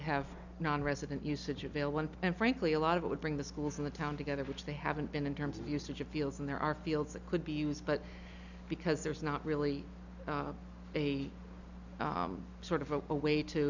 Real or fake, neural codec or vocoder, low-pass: real; none; 7.2 kHz